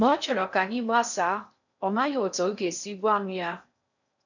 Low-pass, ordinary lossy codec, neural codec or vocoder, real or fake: 7.2 kHz; none; codec, 16 kHz in and 24 kHz out, 0.6 kbps, FocalCodec, streaming, 4096 codes; fake